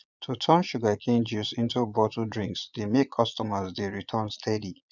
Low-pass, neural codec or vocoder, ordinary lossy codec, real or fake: 7.2 kHz; vocoder, 22.05 kHz, 80 mel bands, Vocos; none; fake